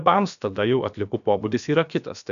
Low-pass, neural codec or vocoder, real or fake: 7.2 kHz; codec, 16 kHz, about 1 kbps, DyCAST, with the encoder's durations; fake